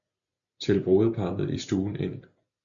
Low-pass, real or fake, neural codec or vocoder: 7.2 kHz; real; none